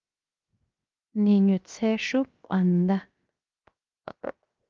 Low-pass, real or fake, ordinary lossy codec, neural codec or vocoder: 7.2 kHz; fake; Opus, 32 kbps; codec, 16 kHz, 0.7 kbps, FocalCodec